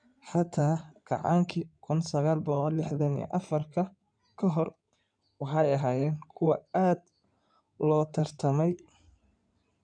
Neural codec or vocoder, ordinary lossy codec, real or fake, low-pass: codec, 16 kHz in and 24 kHz out, 2.2 kbps, FireRedTTS-2 codec; none; fake; 9.9 kHz